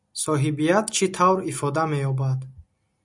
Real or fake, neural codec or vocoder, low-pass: real; none; 10.8 kHz